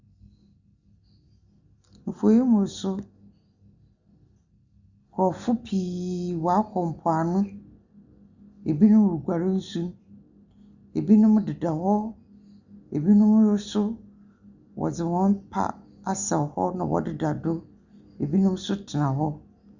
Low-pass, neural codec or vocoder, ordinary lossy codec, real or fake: 7.2 kHz; none; Opus, 64 kbps; real